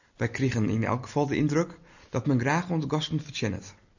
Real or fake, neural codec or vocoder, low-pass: real; none; 7.2 kHz